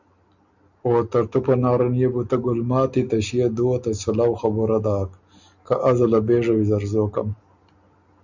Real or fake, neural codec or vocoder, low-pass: real; none; 7.2 kHz